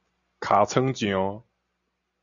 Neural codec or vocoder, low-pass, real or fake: none; 7.2 kHz; real